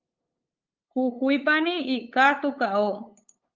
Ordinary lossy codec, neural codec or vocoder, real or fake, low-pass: Opus, 24 kbps; codec, 16 kHz, 8 kbps, FunCodec, trained on LibriTTS, 25 frames a second; fake; 7.2 kHz